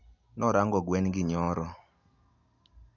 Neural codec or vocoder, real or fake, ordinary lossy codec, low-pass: none; real; none; 7.2 kHz